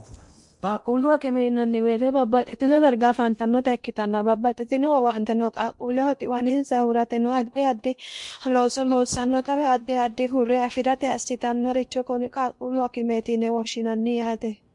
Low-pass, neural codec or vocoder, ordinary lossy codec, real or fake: 10.8 kHz; codec, 16 kHz in and 24 kHz out, 0.8 kbps, FocalCodec, streaming, 65536 codes; MP3, 64 kbps; fake